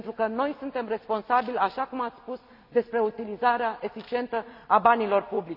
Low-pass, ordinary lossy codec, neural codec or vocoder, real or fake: 5.4 kHz; none; none; real